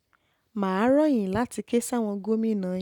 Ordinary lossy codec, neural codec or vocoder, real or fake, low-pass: none; none; real; 19.8 kHz